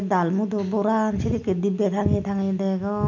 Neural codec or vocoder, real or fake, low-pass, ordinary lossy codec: none; real; 7.2 kHz; none